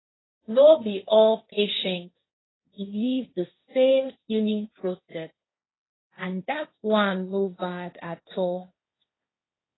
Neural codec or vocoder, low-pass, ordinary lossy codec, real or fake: codec, 16 kHz, 1.1 kbps, Voila-Tokenizer; 7.2 kHz; AAC, 16 kbps; fake